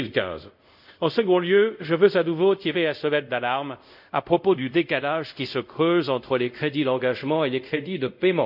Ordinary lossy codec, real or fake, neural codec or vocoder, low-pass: none; fake; codec, 24 kHz, 0.5 kbps, DualCodec; 5.4 kHz